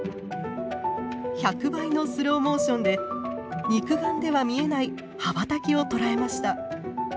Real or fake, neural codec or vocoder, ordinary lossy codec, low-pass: real; none; none; none